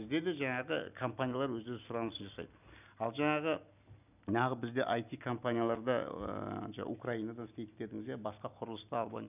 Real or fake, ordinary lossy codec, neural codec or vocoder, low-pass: real; none; none; 3.6 kHz